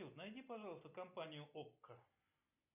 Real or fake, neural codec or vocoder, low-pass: real; none; 3.6 kHz